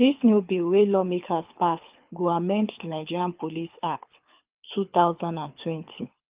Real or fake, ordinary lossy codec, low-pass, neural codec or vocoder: fake; Opus, 24 kbps; 3.6 kHz; codec, 24 kHz, 6 kbps, HILCodec